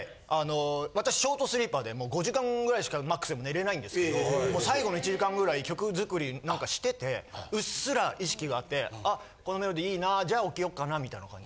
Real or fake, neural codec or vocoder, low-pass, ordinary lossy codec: real; none; none; none